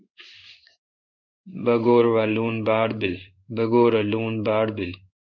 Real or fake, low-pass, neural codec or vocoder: fake; 7.2 kHz; codec, 16 kHz in and 24 kHz out, 1 kbps, XY-Tokenizer